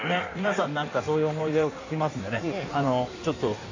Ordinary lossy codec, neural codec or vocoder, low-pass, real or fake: AAC, 48 kbps; codec, 16 kHz in and 24 kHz out, 1.1 kbps, FireRedTTS-2 codec; 7.2 kHz; fake